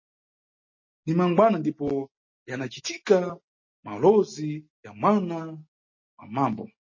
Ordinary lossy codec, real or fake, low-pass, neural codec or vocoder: MP3, 32 kbps; real; 7.2 kHz; none